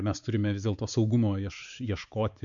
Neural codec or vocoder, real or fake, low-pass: codec, 16 kHz, 4 kbps, X-Codec, WavLM features, trained on Multilingual LibriSpeech; fake; 7.2 kHz